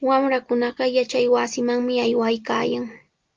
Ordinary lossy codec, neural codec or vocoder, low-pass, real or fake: Opus, 24 kbps; none; 7.2 kHz; real